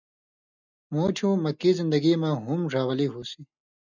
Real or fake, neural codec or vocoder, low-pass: real; none; 7.2 kHz